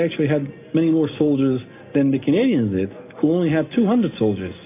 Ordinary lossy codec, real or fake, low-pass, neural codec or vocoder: AAC, 24 kbps; real; 3.6 kHz; none